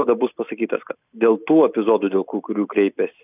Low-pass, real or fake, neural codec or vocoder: 3.6 kHz; real; none